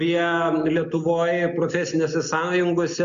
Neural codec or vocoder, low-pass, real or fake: none; 7.2 kHz; real